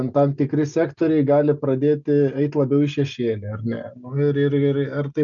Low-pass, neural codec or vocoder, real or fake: 7.2 kHz; none; real